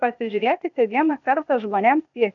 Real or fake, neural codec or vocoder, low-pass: fake; codec, 16 kHz, 0.8 kbps, ZipCodec; 7.2 kHz